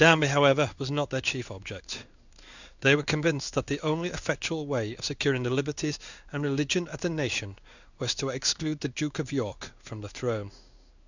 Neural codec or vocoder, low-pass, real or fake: codec, 16 kHz in and 24 kHz out, 1 kbps, XY-Tokenizer; 7.2 kHz; fake